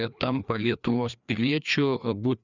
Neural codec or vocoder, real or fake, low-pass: codec, 16 kHz, 2 kbps, FreqCodec, larger model; fake; 7.2 kHz